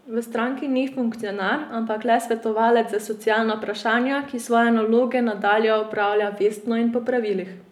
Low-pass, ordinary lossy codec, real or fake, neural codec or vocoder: 19.8 kHz; none; real; none